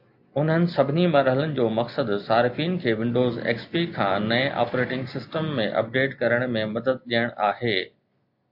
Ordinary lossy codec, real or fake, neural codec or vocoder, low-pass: AAC, 48 kbps; real; none; 5.4 kHz